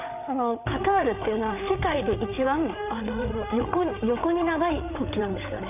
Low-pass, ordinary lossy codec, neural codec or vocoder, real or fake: 3.6 kHz; none; codec, 16 kHz, 8 kbps, FreqCodec, larger model; fake